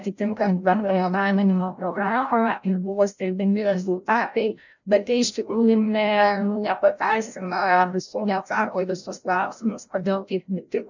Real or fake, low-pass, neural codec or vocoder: fake; 7.2 kHz; codec, 16 kHz, 0.5 kbps, FreqCodec, larger model